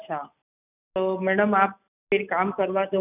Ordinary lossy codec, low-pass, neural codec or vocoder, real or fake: none; 3.6 kHz; none; real